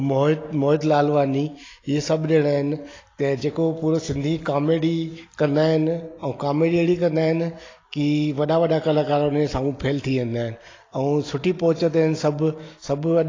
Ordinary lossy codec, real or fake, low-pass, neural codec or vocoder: AAC, 32 kbps; real; 7.2 kHz; none